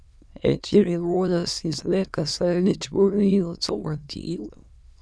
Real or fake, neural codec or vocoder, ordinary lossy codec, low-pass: fake; autoencoder, 22.05 kHz, a latent of 192 numbers a frame, VITS, trained on many speakers; none; none